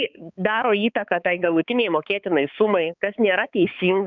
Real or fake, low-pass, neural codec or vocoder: fake; 7.2 kHz; codec, 16 kHz, 4 kbps, X-Codec, HuBERT features, trained on balanced general audio